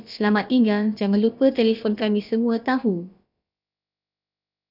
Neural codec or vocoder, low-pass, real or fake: codec, 16 kHz, about 1 kbps, DyCAST, with the encoder's durations; 5.4 kHz; fake